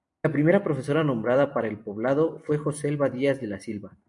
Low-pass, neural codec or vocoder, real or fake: 10.8 kHz; vocoder, 44.1 kHz, 128 mel bands every 256 samples, BigVGAN v2; fake